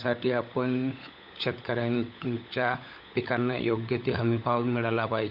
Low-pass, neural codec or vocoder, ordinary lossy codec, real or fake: 5.4 kHz; codec, 16 kHz, 16 kbps, FunCodec, trained on LibriTTS, 50 frames a second; none; fake